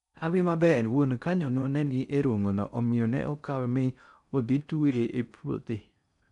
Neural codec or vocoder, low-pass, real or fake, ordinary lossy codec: codec, 16 kHz in and 24 kHz out, 0.6 kbps, FocalCodec, streaming, 4096 codes; 10.8 kHz; fake; none